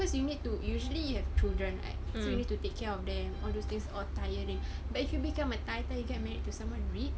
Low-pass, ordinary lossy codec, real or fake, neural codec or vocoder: none; none; real; none